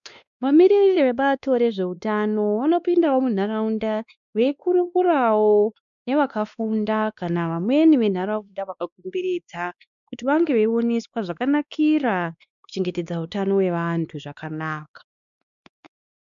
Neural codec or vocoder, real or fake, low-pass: codec, 16 kHz, 2 kbps, X-Codec, WavLM features, trained on Multilingual LibriSpeech; fake; 7.2 kHz